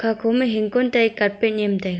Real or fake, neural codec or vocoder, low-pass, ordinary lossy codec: real; none; none; none